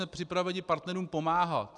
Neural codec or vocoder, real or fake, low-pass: none; real; 10.8 kHz